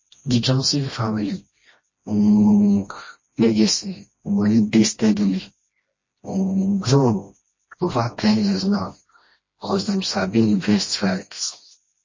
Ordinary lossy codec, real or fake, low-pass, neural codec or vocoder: MP3, 32 kbps; fake; 7.2 kHz; codec, 16 kHz, 1 kbps, FreqCodec, smaller model